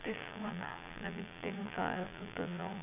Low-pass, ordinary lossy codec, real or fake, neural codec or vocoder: 3.6 kHz; none; fake; vocoder, 22.05 kHz, 80 mel bands, Vocos